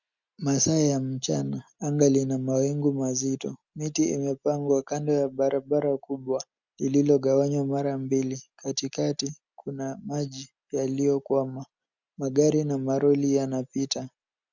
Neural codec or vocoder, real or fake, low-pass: none; real; 7.2 kHz